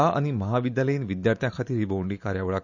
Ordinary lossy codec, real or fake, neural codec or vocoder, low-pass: none; real; none; 7.2 kHz